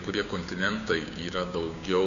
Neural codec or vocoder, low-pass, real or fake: codec, 16 kHz, 6 kbps, DAC; 7.2 kHz; fake